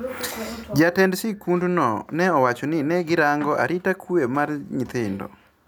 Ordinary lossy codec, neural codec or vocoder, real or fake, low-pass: none; none; real; none